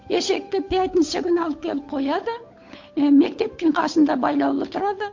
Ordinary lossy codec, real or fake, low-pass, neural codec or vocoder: MP3, 48 kbps; fake; 7.2 kHz; codec, 16 kHz, 8 kbps, FunCodec, trained on Chinese and English, 25 frames a second